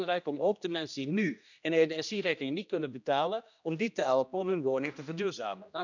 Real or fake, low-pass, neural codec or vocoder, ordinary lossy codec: fake; 7.2 kHz; codec, 16 kHz, 1 kbps, X-Codec, HuBERT features, trained on general audio; none